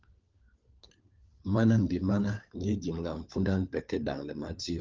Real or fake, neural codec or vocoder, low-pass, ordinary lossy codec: fake; codec, 16 kHz, 4 kbps, FunCodec, trained on LibriTTS, 50 frames a second; 7.2 kHz; Opus, 24 kbps